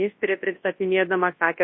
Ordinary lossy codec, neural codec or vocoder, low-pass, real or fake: MP3, 24 kbps; codec, 24 kHz, 0.9 kbps, WavTokenizer, large speech release; 7.2 kHz; fake